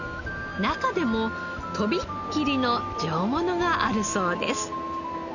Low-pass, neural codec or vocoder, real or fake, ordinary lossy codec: 7.2 kHz; none; real; none